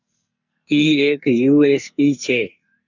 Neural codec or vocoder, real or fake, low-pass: codec, 44.1 kHz, 2.6 kbps, SNAC; fake; 7.2 kHz